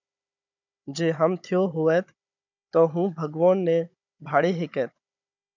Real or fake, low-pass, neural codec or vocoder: fake; 7.2 kHz; codec, 16 kHz, 16 kbps, FunCodec, trained on Chinese and English, 50 frames a second